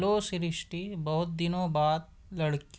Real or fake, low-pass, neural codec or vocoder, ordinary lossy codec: real; none; none; none